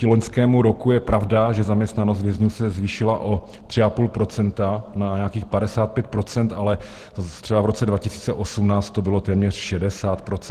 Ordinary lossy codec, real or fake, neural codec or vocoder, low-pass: Opus, 16 kbps; real; none; 14.4 kHz